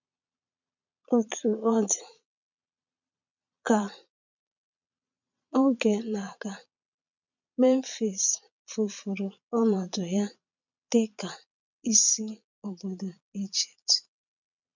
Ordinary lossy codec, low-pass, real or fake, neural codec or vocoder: none; 7.2 kHz; fake; vocoder, 22.05 kHz, 80 mel bands, Vocos